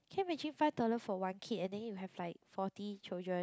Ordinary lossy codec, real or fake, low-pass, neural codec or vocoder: none; real; none; none